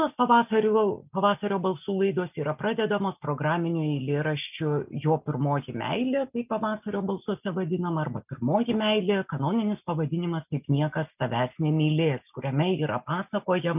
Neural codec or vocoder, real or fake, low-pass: none; real; 3.6 kHz